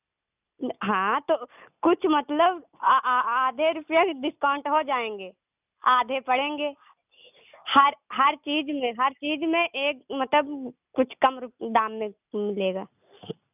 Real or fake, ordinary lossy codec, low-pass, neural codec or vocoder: real; none; 3.6 kHz; none